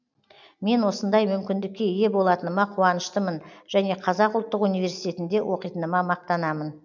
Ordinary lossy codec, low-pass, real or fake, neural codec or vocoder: none; 7.2 kHz; real; none